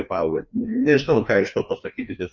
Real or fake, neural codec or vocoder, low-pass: fake; codec, 16 kHz, 2 kbps, FreqCodec, larger model; 7.2 kHz